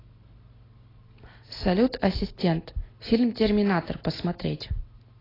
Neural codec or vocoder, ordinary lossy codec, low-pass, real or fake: none; AAC, 24 kbps; 5.4 kHz; real